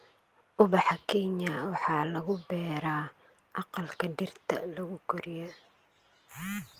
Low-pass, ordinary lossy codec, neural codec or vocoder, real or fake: 14.4 kHz; Opus, 24 kbps; none; real